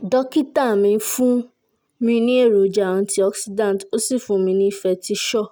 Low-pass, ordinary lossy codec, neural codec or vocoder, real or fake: none; none; none; real